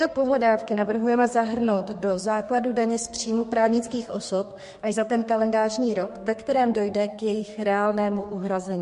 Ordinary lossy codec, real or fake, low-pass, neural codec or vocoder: MP3, 48 kbps; fake; 14.4 kHz; codec, 32 kHz, 1.9 kbps, SNAC